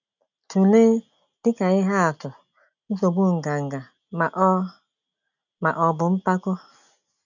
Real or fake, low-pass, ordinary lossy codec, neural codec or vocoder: real; 7.2 kHz; none; none